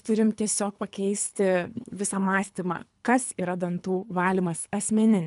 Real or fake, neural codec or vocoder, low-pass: fake; codec, 24 kHz, 3 kbps, HILCodec; 10.8 kHz